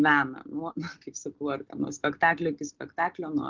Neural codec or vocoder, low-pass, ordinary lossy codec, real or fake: none; 7.2 kHz; Opus, 16 kbps; real